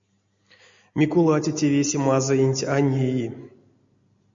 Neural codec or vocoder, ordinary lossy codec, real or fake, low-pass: none; MP3, 48 kbps; real; 7.2 kHz